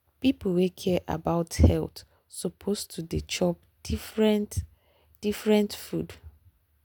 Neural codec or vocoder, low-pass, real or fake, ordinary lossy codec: none; none; real; none